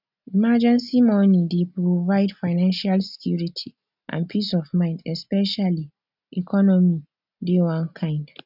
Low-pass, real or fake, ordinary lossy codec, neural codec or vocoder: 5.4 kHz; real; none; none